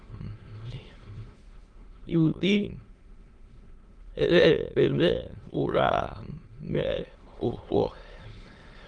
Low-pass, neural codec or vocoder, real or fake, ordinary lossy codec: 9.9 kHz; autoencoder, 22.05 kHz, a latent of 192 numbers a frame, VITS, trained on many speakers; fake; Opus, 24 kbps